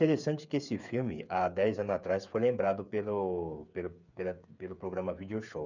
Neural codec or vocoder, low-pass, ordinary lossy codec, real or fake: codec, 16 kHz, 8 kbps, FreqCodec, smaller model; 7.2 kHz; none; fake